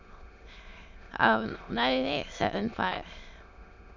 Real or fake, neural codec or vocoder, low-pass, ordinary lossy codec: fake; autoencoder, 22.05 kHz, a latent of 192 numbers a frame, VITS, trained on many speakers; 7.2 kHz; MP3, 64 kbps